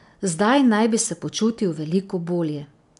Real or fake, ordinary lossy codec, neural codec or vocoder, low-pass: real; none; none; 10.8 kHz